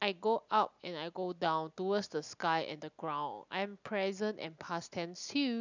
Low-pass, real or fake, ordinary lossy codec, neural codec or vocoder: 7.2 kHz; real; none; none